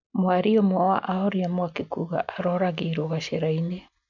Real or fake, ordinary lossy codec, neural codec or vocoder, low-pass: real; none; none; 7.2 kHz